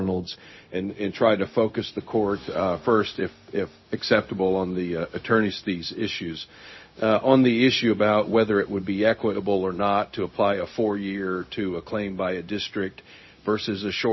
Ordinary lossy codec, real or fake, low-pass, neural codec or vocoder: MP3, 24 kbps; fake; 7.2 kHz; codec, 16 kHz, 0.4 kbps, LongCat-Audio-Codec